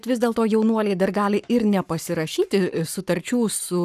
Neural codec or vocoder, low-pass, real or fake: codec, 44.1 kHz, 7.8 kbps, Pupu-Codec; 14.4 kHz; fake